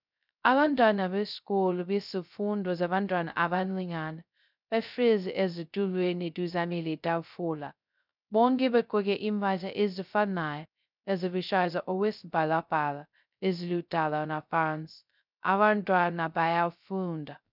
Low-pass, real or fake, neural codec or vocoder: 5.4 kHz; fake; codec, 16 kHz, 0.2 kbps, FocalCodec